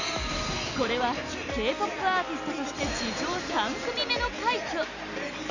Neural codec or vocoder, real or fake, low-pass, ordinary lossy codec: none; real; 7.2 kHz; none